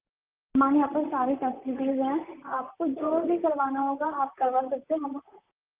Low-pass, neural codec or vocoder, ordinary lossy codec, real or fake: 3.6 kHz; vocoder, 44.1 kHz, 128 mel bands, Pupu-Vocoder; Opus, 32 kbps; fake